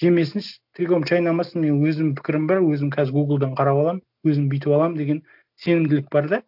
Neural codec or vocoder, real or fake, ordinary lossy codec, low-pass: none; real; none; 5.4 kHz